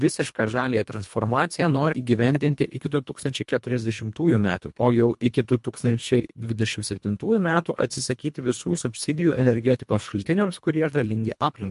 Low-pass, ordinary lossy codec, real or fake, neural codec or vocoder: 10.8 kHz; MP3, 64 kbps; fake; codec, 24 kHz, 1.5 kbps, HILCodec